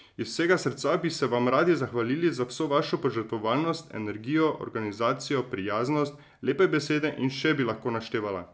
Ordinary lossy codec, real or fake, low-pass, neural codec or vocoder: none; real; none; none